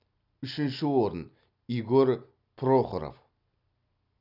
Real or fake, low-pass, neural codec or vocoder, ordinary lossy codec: real; 5.4 kHz; none; none